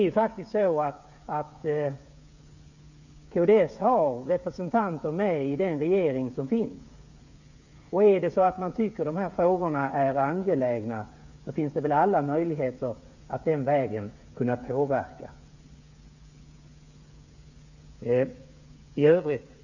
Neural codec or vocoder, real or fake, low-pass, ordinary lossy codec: codec, 16 kHz, 8 kbps, FreqCodec, smaller model; fake; 7.2 kHz; none